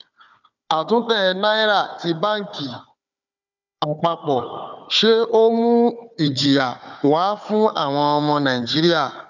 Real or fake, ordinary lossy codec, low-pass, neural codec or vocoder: fake; none; 7.2 kHz; codec, 16 kHz, 4 kbps, FunCodec, trained on Chinese and English, 50 frames a second